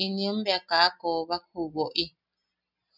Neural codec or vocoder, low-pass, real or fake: none; 5.4 kHz; real